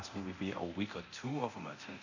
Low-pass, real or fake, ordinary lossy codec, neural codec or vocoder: 7.2 kHz; fake; none; codec, 24 kHz, 0.9 kbps, DualCodec